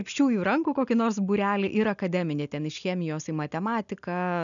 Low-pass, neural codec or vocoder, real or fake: 7.2 kHz; none; real